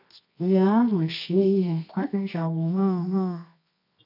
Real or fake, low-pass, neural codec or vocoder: fake; 5.4 kHz; codec, 24 kHz, 0.9 kbps, WavTokenizer, medium music audio release